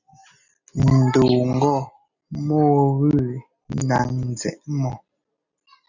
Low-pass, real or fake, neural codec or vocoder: 7.2 kHz; real; none